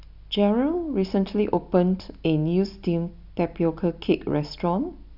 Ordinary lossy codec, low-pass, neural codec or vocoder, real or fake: none; 5.4 kHz; none; real